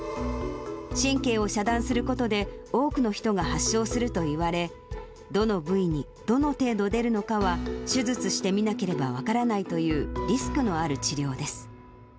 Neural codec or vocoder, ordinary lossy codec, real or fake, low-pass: none; none; real; none